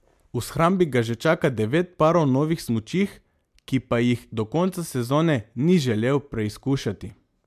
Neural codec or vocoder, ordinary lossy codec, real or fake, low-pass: none; MP3, 96 kbps; real; 14.4 kHz